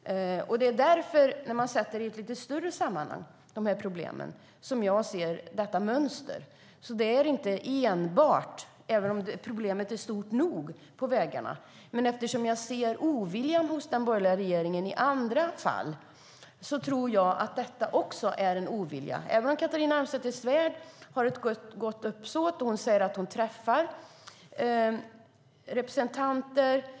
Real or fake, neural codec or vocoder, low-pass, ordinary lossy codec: real; none; none; none